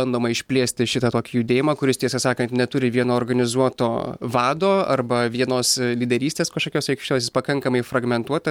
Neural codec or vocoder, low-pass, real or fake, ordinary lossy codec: none; 19.8 kHz; real; MP3, 96 kbps